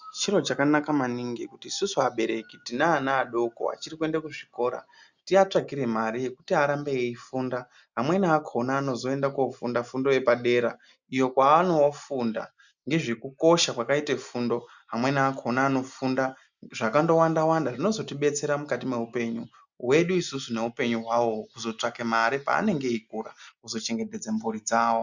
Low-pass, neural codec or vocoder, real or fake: 7.2 kHz; none; real